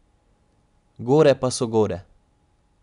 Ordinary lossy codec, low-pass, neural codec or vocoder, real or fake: none; 10.8 kHz; none; real